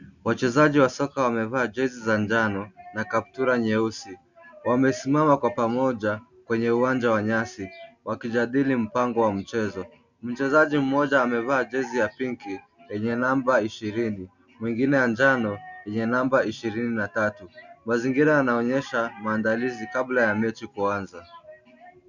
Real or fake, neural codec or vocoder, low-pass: real; none; 7.2 kHz